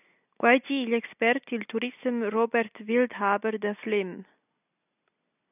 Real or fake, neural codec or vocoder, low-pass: real; none; 3.6 kHz